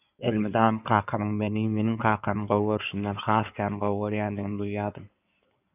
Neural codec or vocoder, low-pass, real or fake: codec, 16 kHz in and 24 kHz out, 2.2 kbps, FireRedTTS-2 codec; 3.6 kHz; fake